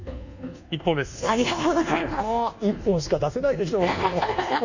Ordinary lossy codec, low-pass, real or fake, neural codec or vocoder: none; 7.2 kHz; fake; codec, 24 kHz, 1.2 kbps, DualCodec